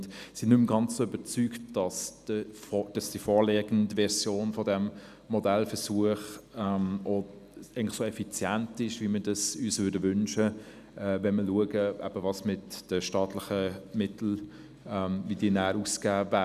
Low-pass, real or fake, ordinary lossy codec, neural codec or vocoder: 14.4 kHz; real; none; none